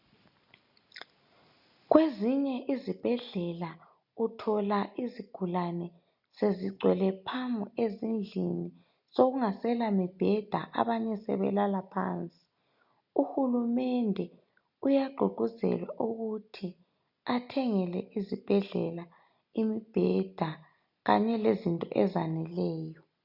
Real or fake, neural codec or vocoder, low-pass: real; none; 5.4 kHz